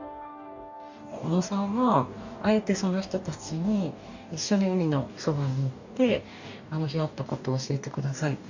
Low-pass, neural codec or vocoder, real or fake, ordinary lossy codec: 7.2 kHz; codec, 44.1 kHz, 2.6 kbps, DAC; fake; none